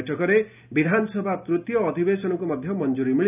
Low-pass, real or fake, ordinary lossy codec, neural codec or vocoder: 3.6 kHz; real; none; none